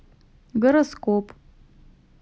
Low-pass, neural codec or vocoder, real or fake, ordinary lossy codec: none; none; real; none